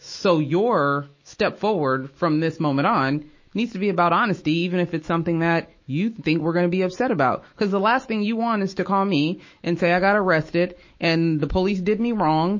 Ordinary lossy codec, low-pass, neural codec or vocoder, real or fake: MP3, 32 kbps; 7.2 kHz; autoencoder, 48 kHz, 128 numbers a frame, DAC-VAE, trained on Japanese speech; fake